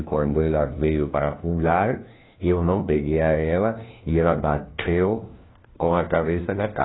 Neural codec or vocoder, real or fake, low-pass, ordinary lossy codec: codec, 16 kHz, 1 kbps, FunCodec, trained on LibriTTS, 50 frames a second; fake; 7.2 kHz; AAC, 16 kbps